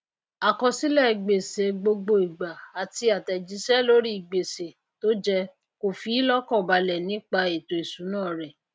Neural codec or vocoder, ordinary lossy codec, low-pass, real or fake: none; none; none; real